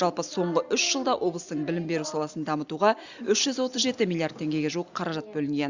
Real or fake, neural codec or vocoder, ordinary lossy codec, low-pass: real; none; Opus, 64 kbps; 7.2 kHz